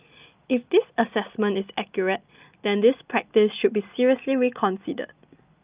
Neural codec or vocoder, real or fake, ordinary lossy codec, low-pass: none; real; Opus, 24 kbps; 3.6 kHz